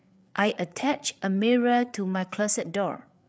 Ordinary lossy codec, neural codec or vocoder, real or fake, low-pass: none; codec, 16 kHz, 8 kbps, FreqCodec, larger model; fake; none